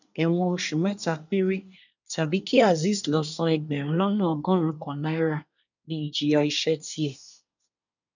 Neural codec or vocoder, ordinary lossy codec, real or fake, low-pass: codec, 24 kHz, 1 kbps, SNAC; none; fake; 7.2 kHz